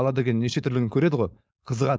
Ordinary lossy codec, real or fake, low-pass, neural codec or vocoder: none; fake; none; codec, 16 kHz, 4.8 kbps, FACodec